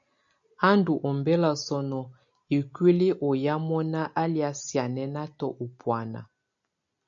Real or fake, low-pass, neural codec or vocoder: real; 7.2 kHz; none